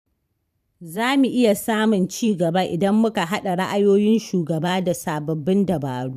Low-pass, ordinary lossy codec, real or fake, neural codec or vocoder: 14.4 kHz; none; fake; vocoder, 44.1 kHz, 128 mel bands every 512 samples, BigVGAN v2